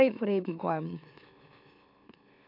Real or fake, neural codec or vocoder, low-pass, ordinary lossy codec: fake; autoencoder, 44.1 kHz, a latent of 192 numbers a frame, MeloTTS; 5.4 kHz; AAC, 48 kbps